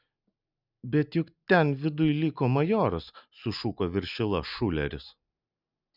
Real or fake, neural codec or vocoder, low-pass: real; none; 5.4 kHz